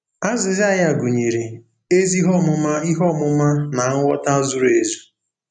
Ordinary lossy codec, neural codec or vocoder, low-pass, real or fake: none; none; 9.9 kHz; real